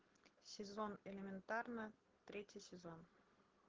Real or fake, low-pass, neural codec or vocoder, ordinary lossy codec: real; 7.2 kHz; none; Opus, 16 kbps